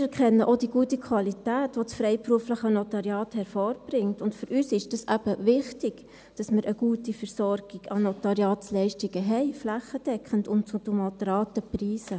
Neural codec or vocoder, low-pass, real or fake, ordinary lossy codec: none; none; real; none